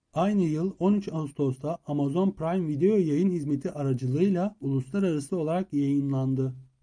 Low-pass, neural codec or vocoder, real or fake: 9.9 kHz; none; real